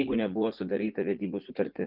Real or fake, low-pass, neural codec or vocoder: fake; 5.4 kHz; vocoder, 44.1 kHz, 80 mel bands, Vocos